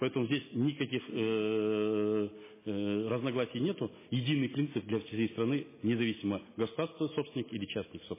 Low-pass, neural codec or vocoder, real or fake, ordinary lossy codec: 3.6 kHz; none; real; MP3, 16 kbps